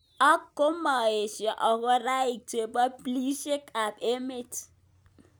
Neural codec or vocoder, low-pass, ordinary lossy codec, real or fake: none; none; none; real